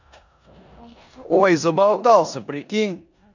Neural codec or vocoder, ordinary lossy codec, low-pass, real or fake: codec, 16 kHz in and 24 kHz out, 0.9 kbps, LongCat-Audio-Codec, four codebook decoder; none; 7.2 kHz; fake